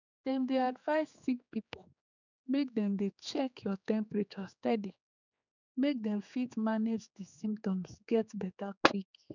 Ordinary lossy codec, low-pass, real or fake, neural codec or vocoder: none; 7.2 kHz; fake; codec, 16 kHz, 4 kbps, X-Codec, HuBERT features, trained on general audio